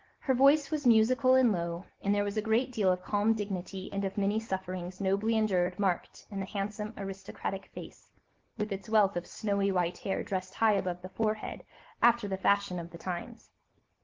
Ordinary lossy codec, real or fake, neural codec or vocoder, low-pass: Opus, 16 kbps; real; none; 7.2 kHz